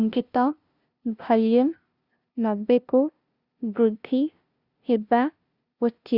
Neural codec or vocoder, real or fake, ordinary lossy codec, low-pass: codec, 16 kHz, 0.5 kbps, FunCodec, trained on LibriTTS, 25 frames a second; fake; Opus, 64 kbps; 5.4 kHz